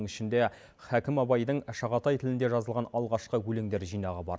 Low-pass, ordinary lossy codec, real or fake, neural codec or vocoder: none; none; real; none